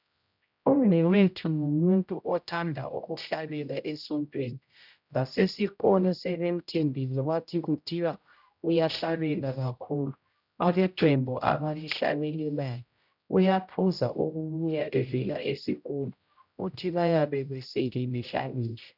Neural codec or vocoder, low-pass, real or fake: codec, 16 kHz, 0.5 kbps, X-Codec, HuBERT features, trained on general audio; 5.4 kHz; fake